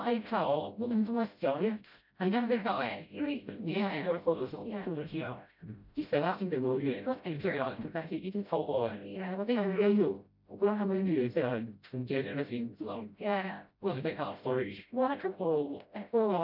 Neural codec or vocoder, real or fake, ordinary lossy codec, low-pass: codec, 16 kHz, 0.5 kbps, FreqCodec, smaller model; fake; none; 5.4 kHz